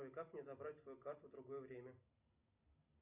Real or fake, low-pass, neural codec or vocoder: real; 3.6 kHz; none